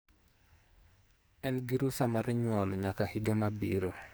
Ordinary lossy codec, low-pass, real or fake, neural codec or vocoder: none; none; fake; codec, 44.1 kHz, 2.6 kbps, SNAC